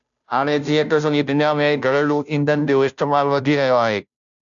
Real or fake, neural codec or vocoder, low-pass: fake; codec, 16 kHz, 0.5 kbps, FunCodec, trained on Chinese and English, 25 frames a second; 7.2 kHz